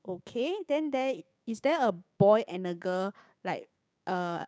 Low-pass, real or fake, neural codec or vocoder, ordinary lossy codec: none; fake; codec, 16 kHz, 6 kbps, DAC; none